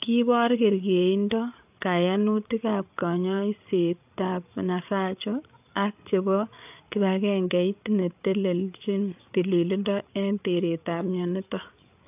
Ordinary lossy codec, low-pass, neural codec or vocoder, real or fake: AAC, 32 kbps; 3.6 kHz; codec, 16 kHz, 8 kbps, FreqCodec, larger model; fake